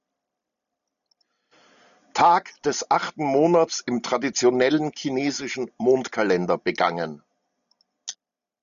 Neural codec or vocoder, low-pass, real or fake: none; 7.2 kHz; real